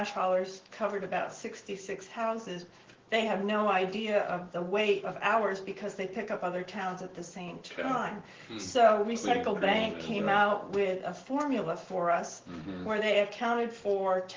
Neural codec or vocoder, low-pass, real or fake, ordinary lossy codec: autoencoder, 48 kHz, 128 numbers a frame, DAC-VAE, trained on Japanese speech; 7.2 kHz; fake; Opus, 16 kbps